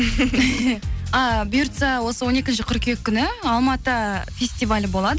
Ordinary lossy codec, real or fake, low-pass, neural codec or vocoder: none; real; none; none